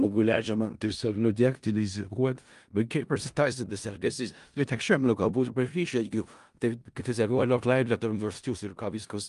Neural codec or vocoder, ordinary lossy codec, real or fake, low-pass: codec, 16 kHz in and 24 kHz out, 0.4 kbps, LongCat-Audio-Codec, four codebook decoder; Opus, 32 kbps; fake; 10.8 kHz